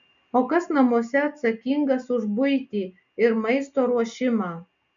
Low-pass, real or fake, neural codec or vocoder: 7.2 kHz; real; none